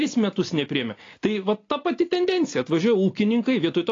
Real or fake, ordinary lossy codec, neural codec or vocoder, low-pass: real; AAC, 32 kbps; none; 7.2 kHz